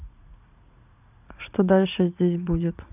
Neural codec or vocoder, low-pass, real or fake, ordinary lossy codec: vocoder, 44.1 kHz, 80 mel bands, Vocos; 3.6 kHz; fake; none